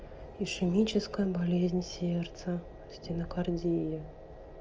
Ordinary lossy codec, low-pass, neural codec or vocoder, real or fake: Opus, 24 kbps; 7.2 kHz; none; real